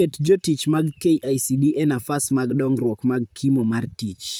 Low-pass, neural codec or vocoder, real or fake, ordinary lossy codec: none; vocoder, 44.1 kHz, 128 mel bands, Pupu-Vocoder; fake; none